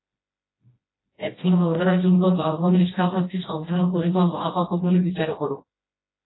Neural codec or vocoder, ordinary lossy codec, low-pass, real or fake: codec, 16 kHz, 1 kbps, FreqCodec, smaller model; AAC, 16 kbps; 7.2 kHz; fake